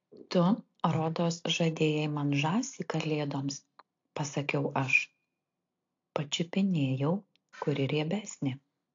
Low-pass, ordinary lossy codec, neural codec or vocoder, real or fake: 7.2 kHz; AAC, 48 kbps; none; real